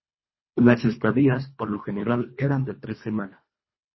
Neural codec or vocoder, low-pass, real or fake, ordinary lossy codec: codec, 24 kHz, 1.5 kbps, HILCodec; 7.2 kHz; fake; MP3, 24 kbps